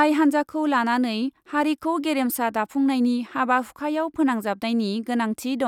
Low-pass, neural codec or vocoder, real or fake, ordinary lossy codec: 19.8 kHz; none; real; none